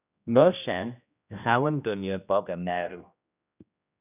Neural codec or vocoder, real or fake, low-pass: codec, 16 kHz, 1 kbps, X-Codec, HuBERT features, trained on general audio; fake; 3.6 kHz